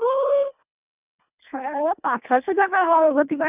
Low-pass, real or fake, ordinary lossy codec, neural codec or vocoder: 3.6 kHz; fake; none; codec, 24 kHz, 1.5 kbps, HILCodec